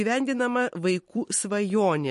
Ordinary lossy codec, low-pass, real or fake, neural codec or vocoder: MP3, 48 kbps; 14.4 kHz; fake; vocoder, 44.1 kHz, 128 mel bands every 256 samples, BigVGAN v2